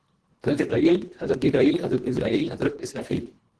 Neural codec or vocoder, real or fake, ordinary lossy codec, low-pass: codec, 24 kHz, 1.5 kbps, HILCodec; fake; Opus, 16 kbps; 10.8 kHz